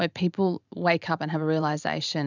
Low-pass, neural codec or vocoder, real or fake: 7.2 kHz; vocoder, 44.1 kHz, 128 mel bands every 512 samples, BigVGAN v2; fake